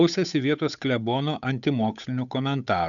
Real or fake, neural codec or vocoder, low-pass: fake; codec, 16 kHz, 8 kbps, FunCodec, trained on Chinese and English, 25 frames a second; 7.2 kHz